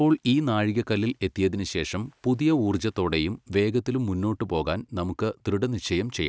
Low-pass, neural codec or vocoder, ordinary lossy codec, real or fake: none; none; none; real